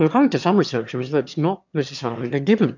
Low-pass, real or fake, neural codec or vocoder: 7.2 kHz; fake; autoencoder, 22.05 kHz, a latent of 192 numbers a frame, VITS, trained on one speaker